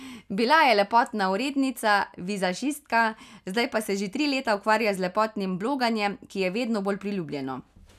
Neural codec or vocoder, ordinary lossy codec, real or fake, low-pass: none; none; real; 14.4 kHz